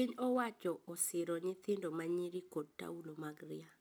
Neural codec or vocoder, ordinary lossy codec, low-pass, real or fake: none; none; none; real